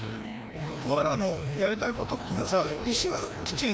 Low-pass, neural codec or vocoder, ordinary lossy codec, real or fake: none; codec, 16 kHz, 1 kbps, FreqCodec, larger model; none; fake